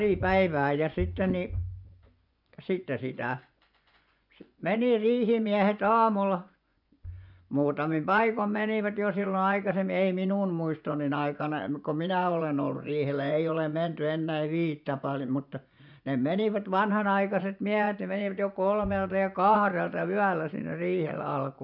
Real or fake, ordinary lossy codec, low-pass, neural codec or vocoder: fake; none; 5.4 kHz; vocoder, 24 kHz, 100 mel bands, Vocos